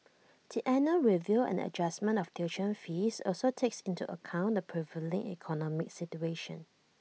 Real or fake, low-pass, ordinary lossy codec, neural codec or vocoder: real; none; none; none